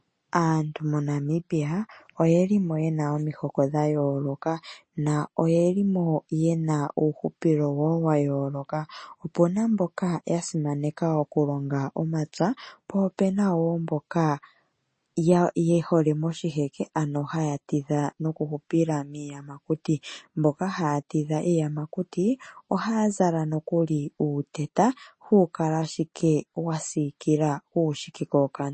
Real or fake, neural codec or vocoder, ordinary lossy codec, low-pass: real; none; MP3, 32 kbps; 9.9 kHz